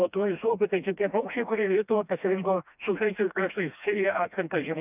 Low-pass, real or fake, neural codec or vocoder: 3.6 kHz; fake; codec, 16 kHz, 1 kbps, FreqCodec, smaller model